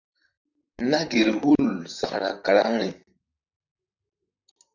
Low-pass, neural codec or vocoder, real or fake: 7.2 kHz; vocoder, 44.1 kHz, 128 mel bands, Pupu-Vocoder; fake